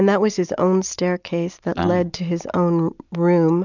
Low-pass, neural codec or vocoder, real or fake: 7.2 kHz; none; real